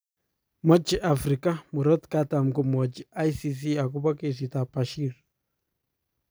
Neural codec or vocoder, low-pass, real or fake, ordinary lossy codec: none; none; real; none